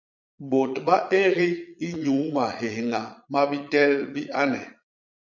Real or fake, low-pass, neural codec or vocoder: fake; 7.2 kHz; vocoder, 22.05 kHz, 80 mel bands, Vocos